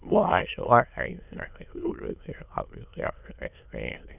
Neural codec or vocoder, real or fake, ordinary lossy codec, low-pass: autoencoder, 22.05 kHz, a latent of 192 numbers a frame, VITS, trained on many speakers; fake; none; 3.6 kHz